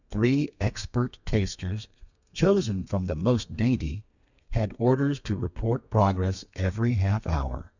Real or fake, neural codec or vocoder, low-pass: fake; codec, 32 kHz, 1.9 kbps, SNAC; 7.2 kHz